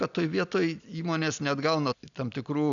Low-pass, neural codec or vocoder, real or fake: 7.2 kHz; none; real